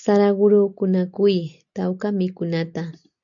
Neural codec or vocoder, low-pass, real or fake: none; 7.2 kHz; real